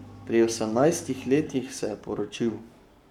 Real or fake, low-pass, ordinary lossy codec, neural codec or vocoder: fake; 19.8 kHz; none; codec, 44.1 kHz, 7.8 kbps, Pupu-Codec